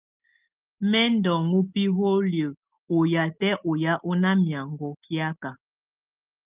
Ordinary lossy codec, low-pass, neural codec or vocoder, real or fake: Opus, 24 kbps; 3.6 kHz; none; real